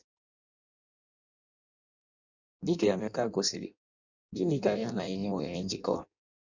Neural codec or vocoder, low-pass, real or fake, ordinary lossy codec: codec, 16 kHz in and 24 kHz out, 0.6 kbps, FireRedTTS-2 codec; 7.2 kHz; fake; none